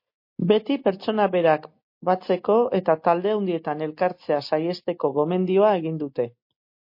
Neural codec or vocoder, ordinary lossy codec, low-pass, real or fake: none; MP3, 32 kbps; 5.4 kHz; real